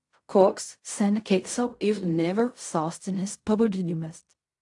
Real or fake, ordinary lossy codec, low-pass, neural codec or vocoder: fake; AAC, 64 kbps; 10.8 kHz; codec, 16 kHz in and 24 kHz out, 0.4 kbps, LongCat-Audio-Codec, fine tuned four codebook decoder